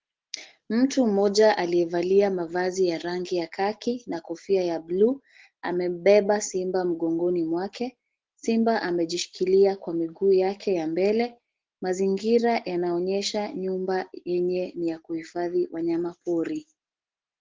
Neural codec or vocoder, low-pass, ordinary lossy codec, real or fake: none; 7.2 kHz; Opus, 16 kbps; real